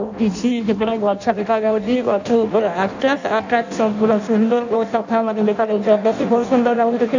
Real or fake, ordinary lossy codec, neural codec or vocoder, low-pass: fake; none; codec, 16 kHz in and 24 kHz out, 0.6 kbps, FireRedTTS-2 codec; 7.2 kHz